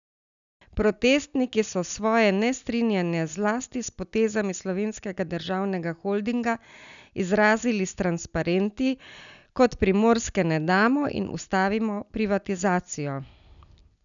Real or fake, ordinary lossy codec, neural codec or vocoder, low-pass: real; none; none; 7.2 kHz